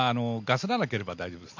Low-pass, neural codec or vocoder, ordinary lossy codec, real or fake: 7.2 kHz; none; none; real